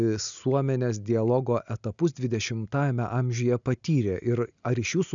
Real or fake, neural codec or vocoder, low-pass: real; none; 7.2 kHz